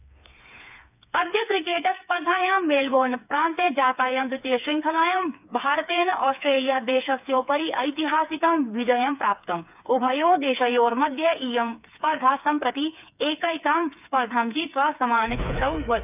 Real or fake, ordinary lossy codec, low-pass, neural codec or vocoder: fake; none; 3.6 kHz; codec, 16 kHz, 4 kbps, FreqCodec, smaller model